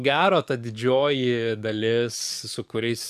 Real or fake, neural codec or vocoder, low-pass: fake; codec, 44.1 kHz, 7.8 kbps, DAC; 14.4 kHz